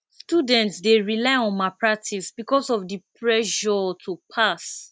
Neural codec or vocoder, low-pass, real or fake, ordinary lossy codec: none; none; real; none